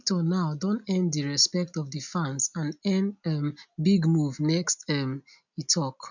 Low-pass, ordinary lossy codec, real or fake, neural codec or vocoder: 7.2 kHz; none; real; none